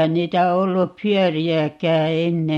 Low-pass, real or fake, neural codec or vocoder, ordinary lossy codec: 19.8 kHz; real; none; MP3, 64 kbps